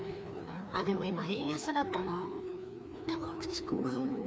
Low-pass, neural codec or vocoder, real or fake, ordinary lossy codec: none; codec, 16 kHz, 2 kbps, FreqCodec, larger model; fake; none